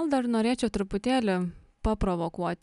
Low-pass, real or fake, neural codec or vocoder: 10.8 kHz; real; none